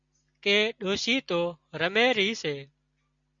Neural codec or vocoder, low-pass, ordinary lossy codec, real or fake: none; 7.2 kHz; MP3, 64 kbps; real